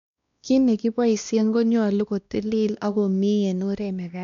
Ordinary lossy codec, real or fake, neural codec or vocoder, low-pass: none; fake; codec, 16 kHz, 1 kbps, X-Codec, WavLM features, trained on Multilingual LibriSpeech; 7.2 kHz